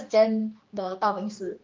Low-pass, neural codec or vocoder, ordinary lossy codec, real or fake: 7.2 kHz; codec, 16 kHz, 2 kbps, X-Codec, HuBERT features, trained on general audio; Opus, 32 kbps; fake